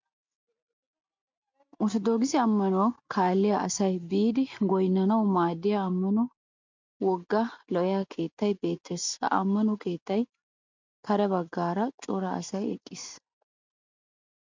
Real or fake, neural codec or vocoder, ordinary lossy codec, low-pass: real; none; MP3, 48 kbps; 7.2 kHz